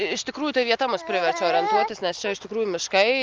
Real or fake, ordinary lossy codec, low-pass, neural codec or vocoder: real; Opus, 32 kbps; 7.2 kHz; none